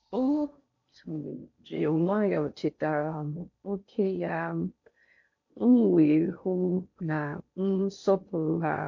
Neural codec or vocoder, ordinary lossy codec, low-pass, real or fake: codec, 16 kHz in and 24 kHz out, 0.6 kbps, FocalCodec, streaming, 4096 codes; MP3, 48 kbps; 7.2 kHz; fake